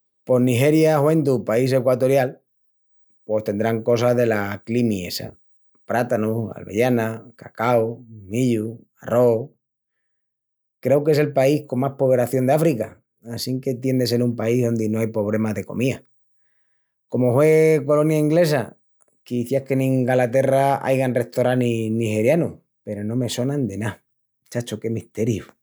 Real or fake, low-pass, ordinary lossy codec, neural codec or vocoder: real; none; none; none